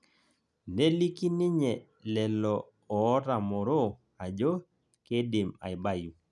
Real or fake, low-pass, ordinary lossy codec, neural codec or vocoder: real; 10.8 kHz; none; none